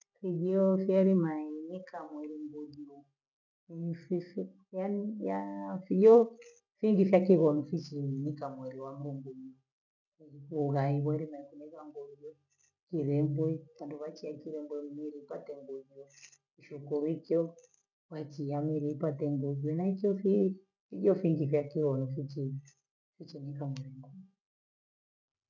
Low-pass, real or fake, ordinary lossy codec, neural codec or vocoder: 7.2 kHz; real; none; none